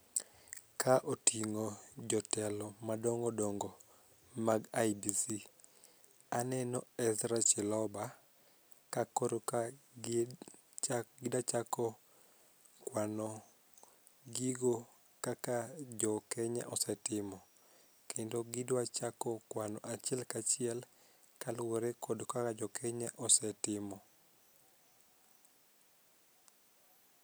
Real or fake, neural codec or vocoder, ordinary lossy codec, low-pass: real; none; none; none